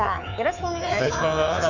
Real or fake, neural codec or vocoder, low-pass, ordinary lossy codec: fake; codec, 24 kHz, 3.1 kbps, DualCodec; 7.2 kHz; none